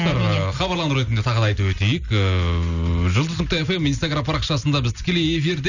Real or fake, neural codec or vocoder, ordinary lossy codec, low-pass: real; none; none; 7.2 kHz